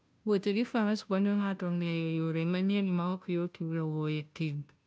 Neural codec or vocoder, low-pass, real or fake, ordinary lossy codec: codec, 16 kHz, 0.5 kbps, FunCodec, trained on Chinese and English, 25 frames a second; none; fake; none